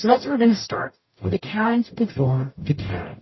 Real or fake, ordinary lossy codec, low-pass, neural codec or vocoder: fake; MP3, 24 kbps; 7.2 kHz; codec, 44.1 kHz, 0.9 kbps, DAC